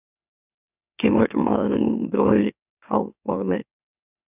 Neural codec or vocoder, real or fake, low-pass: autoencoder, 44.1 kHz, a latent of 192 numbers a frame, MeloTTS; fake; 3.6 kHz